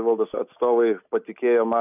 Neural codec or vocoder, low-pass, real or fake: none; 3.6 kHz; real